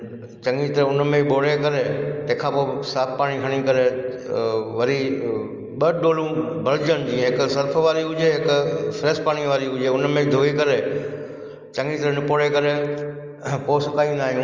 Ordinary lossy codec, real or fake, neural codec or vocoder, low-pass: Opus, 32 kbps; real; none; 7.2 kHz